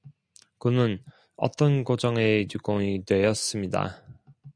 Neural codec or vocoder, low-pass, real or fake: none; 9.9 kHz; real